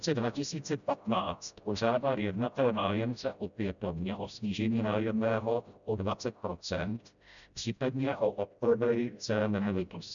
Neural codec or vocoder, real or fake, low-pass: codec, 16 kHz, 0.5 kbps, FreqCodec, smaller model; fake; 7.2 kHz